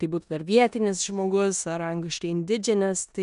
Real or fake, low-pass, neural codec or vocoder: fake; 10.8 kHz; codec, 16 kHz in and 24 kHz out, 0.9 kbps, LongCat-Audio-Codec, four codebook decoder